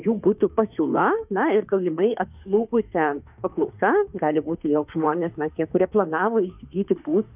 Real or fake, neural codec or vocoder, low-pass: fake; autoencoder, 48 kHz, 32 numbers a frame, DAC-VAE, trained on Japanese speech; 3.6 kHz